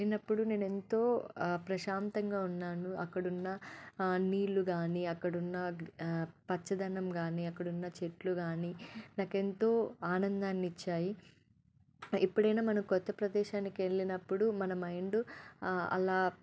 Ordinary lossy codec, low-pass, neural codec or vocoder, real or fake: none; none; none; real